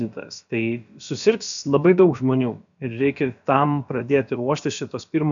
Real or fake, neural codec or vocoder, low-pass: fake; codec, 16 kHz, about 1 kbps, DyCAST, with the encoder's durations; 7.2 kHz